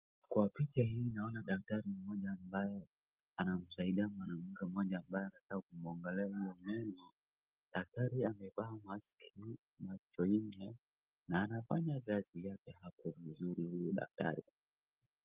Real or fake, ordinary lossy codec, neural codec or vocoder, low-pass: real; Opus, 24 kbps; none; 3.6 kHz